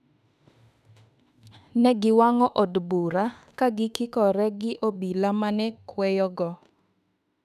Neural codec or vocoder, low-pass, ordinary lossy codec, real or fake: autoencoder, 48 kHz, 32 numbers a frame, DAC-VAE, trained on Japanese speech; 14.4 kHz; none; fake